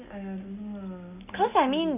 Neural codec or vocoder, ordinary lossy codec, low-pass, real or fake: none; none; 3.6 kHz; real